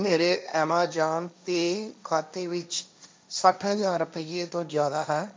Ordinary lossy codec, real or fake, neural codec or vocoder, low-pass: none; fake; codec, 16 kHz, 1.1 kbps, Voila-Tokenizer; none